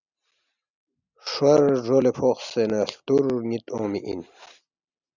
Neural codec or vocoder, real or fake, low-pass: none; real; 7.2 kHz